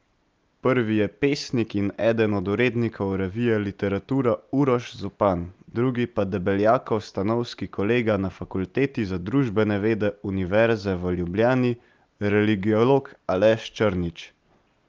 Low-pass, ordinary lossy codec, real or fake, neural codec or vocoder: 7.2 kHz; Opus, 24 kbps; real; none